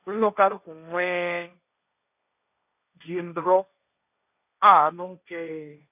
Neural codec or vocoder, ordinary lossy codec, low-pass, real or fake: codec, 16 kHz, 1.1 kbps, Voila-Tokenizer; none; 3.6 kHz; fake